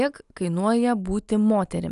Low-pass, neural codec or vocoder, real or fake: 10.8 kHz; none; real